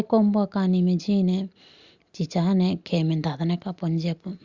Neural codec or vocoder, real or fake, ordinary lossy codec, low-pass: none; real; Opus, 64 kbps; 7.2 kHz